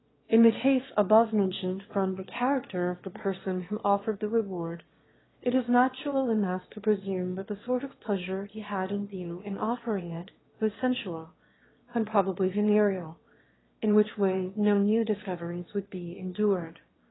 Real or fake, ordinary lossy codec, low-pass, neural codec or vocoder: fake; AAC, 16 kbps; 7.2 kHz; autoencoder, 22.05 kHz, a latent of 192 numbers a frame, VITS, trained on one speaker